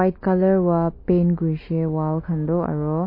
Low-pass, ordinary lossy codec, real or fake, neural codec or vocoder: 5.4 kHz; MP3, 24 kbps; real; none